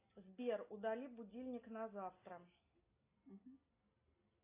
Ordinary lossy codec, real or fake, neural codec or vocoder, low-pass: AAC, 32 kbps; real; none; 3.6 kHz